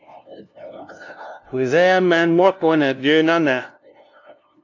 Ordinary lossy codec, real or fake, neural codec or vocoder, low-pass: AAC, 48 kbps; fake; codec, 16 kHz, 0.5 kbps, FunCodec, trained on LibriTTS, 25 frames a second; 7.2 kHz